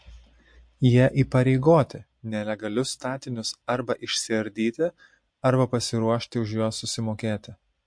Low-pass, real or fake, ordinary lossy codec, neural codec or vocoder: 9.9 kHz; real; MP3, 48 kbps; none